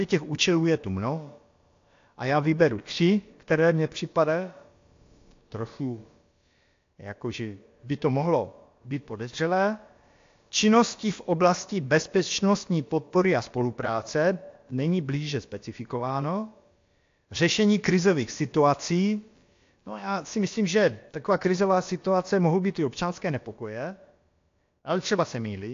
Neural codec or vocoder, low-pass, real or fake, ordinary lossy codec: codec, 16 kHz, about 1 kbps, DyCAST, with the encoder's durations; 7.2 kHz; fake; AAC, 48 kbps